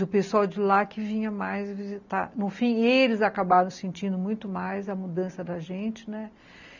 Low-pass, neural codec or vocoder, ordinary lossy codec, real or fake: 7.2 kHz; none; none; real